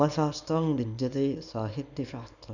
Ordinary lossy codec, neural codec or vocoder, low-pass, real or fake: none; codec, 24 kHz, 0.9 kbps, WavTokenizer, small release; 7.2 kHz; fake